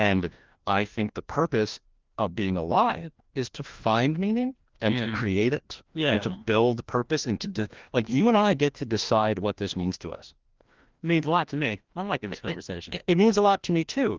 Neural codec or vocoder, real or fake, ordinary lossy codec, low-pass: codec, 16 kHz, 1 kbps, FreqCodec, larger model; fake; Opus, 32 kbps; 7.2 kHz